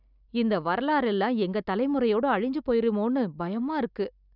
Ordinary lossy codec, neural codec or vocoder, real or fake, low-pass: none; none; real; 5.4 kHz